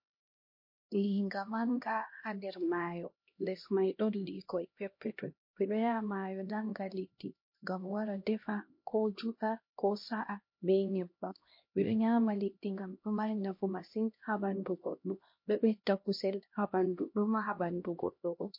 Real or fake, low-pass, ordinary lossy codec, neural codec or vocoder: fake; 5.4 kHz; MP3, 32 kbps; codec, 16 kHz, 1 kbps, X-Codec, HuBERT features, trained on LibriSpeech